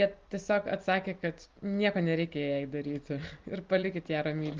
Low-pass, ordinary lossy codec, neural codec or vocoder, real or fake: 7.2 kHz; Opus, 32 kbps; none; real